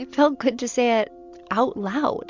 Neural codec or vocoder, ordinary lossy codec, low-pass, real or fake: none; MP3, 64 kbps; 7.2 kHz; real